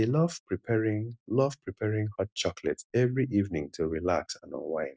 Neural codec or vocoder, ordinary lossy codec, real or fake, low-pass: none; none; real; none